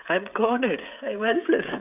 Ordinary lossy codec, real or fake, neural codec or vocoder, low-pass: none; fake; codec, 16 kHz, 16 kbps, FreqCodec, smaller model; 3.6 kHz